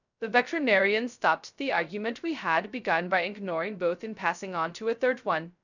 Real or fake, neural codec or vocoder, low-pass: fake; codec, 16 kHz, 0.2 kbps, FocalCodec; 7.2 kHz